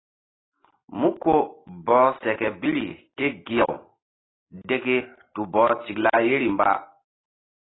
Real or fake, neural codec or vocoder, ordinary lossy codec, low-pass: real; none; AAC, 16 kbps; 7.2 kHz